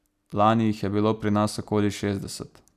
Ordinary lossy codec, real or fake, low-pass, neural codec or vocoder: none; real; 14.4 kHz; none